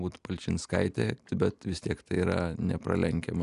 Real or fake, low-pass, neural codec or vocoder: real; 10.8 kHz; none